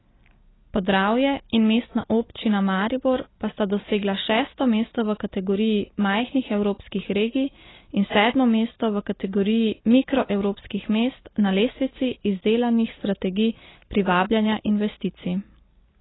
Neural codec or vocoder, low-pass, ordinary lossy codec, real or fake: none; 7.2 kHz; AAC, 16 kbps; real